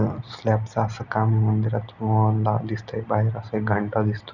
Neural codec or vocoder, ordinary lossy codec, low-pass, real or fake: none; none; 7.2 kHz; real